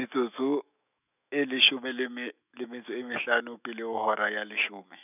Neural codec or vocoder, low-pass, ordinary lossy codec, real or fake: autoencoder, 48 kHz, 128 numbers a frame, DAC-VAE, trained on Japanese speech; 3.6 kHz; none; fake